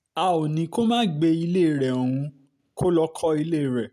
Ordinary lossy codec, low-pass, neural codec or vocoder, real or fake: none; 14.4 kHz; none; real